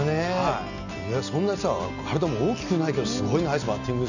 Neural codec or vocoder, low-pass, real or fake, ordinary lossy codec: none; 7.2 kHz; real; none